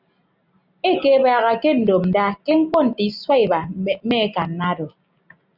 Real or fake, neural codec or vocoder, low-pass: real; none; 5.4 kHz